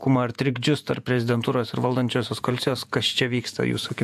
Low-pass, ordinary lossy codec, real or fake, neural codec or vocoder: 14.4 kHz; AAC, 96 kbps; fake; vocoder, 48 kHz, 128 mel bands, Vocos